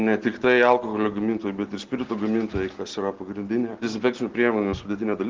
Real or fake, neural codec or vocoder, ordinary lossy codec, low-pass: real; none; Opus, 16 kbps; 7.2 kHz